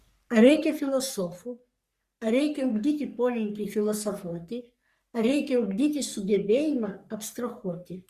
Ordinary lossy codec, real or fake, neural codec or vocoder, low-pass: Opus, 64 kbps; fake; codec, 44.1 kHz, 3.4 kbps, Pupu-Codec; 14.4 kHz